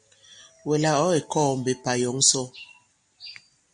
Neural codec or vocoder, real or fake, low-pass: none; real; 9.9 kHz